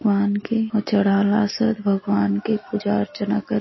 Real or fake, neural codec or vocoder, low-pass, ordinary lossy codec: real; none; 7.2 kHz; MP3, 24 kbps